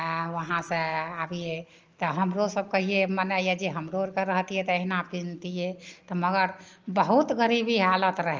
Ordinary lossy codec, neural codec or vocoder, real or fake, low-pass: Opus, 24 kbps; none; real; 7.2 kHz